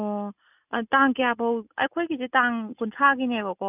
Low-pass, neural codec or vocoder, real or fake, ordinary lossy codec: 3.6 kHz; none; real; none